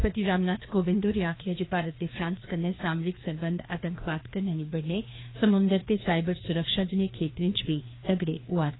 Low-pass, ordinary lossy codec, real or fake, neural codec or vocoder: 7.2 kHz; AAC, 16 kbps; fake; codec, 16 kHz, 2 kbps, FreqCodec, larger model